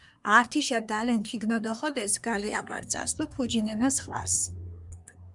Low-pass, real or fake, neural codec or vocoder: 10.8 kHz; fake; codec, 24 kHz, 1 kbps, SNAC